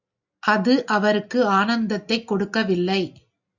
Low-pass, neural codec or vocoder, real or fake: 7.2 kHz; none; real